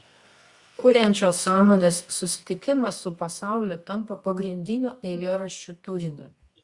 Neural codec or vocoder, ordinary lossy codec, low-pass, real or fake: codec, 24 kHz, 0.9 kbps, WavTokenizer, medium music audio release; Opus, 64 kbps; 10.8 kHz; fake